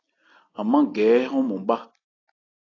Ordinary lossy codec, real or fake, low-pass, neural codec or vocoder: AAC, 32 kbps; real; 7.2 kHz; none